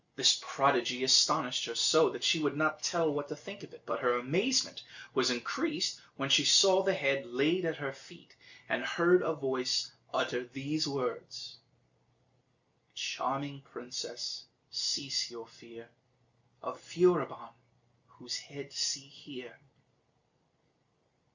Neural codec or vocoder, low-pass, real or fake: none; 7.2 kHz; real